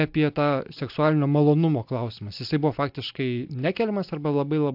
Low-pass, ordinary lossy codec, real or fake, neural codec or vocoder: 5.4 kHz; AAC, 48 kbps; real; none